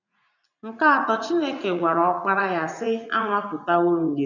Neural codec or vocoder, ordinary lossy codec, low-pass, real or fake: vocoder, 44.1 kHz, 80 mel bands, Vocos; none; 7.2 kHz; fake